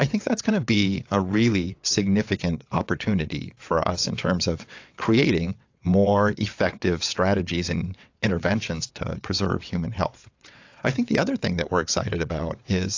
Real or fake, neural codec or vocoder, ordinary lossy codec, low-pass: fake; vocoder, 22.05 kHz, 80 mel bands, WaveNeXt; AAC, 48 kbps; 7.2 kHz